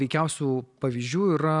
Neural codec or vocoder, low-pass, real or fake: none; 10.8 kHz; real